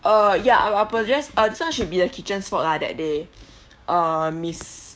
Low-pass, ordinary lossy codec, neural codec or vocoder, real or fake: none; none; codec, 16 kHz, 6 kbps, DAC; fake